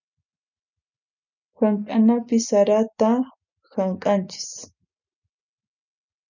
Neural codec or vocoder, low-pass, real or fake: none; 7.2 kHz; real